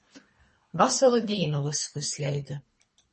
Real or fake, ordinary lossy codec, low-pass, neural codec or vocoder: fake; MP3, 32 kbps; 10.8 kHz; codec, 24 kHz, 3 kbps, HILCodec